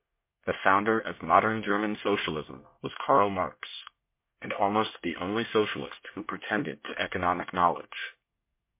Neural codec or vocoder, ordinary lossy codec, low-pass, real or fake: codec, 24 kHz, 1 kbps, SNAC; MP3, 24 kbps; 3.6 kHz; fake